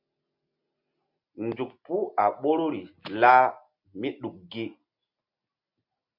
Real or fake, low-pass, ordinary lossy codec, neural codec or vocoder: real; 5.4 kHz; MP3, 48 kbps; none